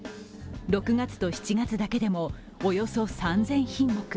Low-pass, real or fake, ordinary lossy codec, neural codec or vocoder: none; real; none; none